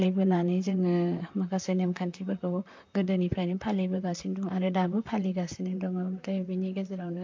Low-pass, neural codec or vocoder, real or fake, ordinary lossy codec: 7.2 kHz; vocoder, 44.1 kHz, 128 mel bands, Pupu-Vocoder; fake; MP3, 48 kbps